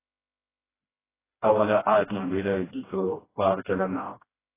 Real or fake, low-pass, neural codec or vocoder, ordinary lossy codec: fake; 3.6 kHz; codec, 16 kHz, 1 kbps, FreqCodec, smaller model; AAC, 16 kbps